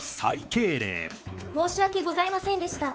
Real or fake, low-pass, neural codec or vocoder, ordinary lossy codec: fake; none; codec, 16 kHz, 4 kbps, X-Codec, WavLM features, trained on Multilingual LibriSpeech; none